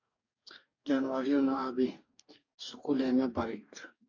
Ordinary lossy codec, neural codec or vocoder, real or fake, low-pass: AAC, 48 kbps; codec, 44.1 kHz, 2.6 kbps, DAC; fake; 7.2 kHz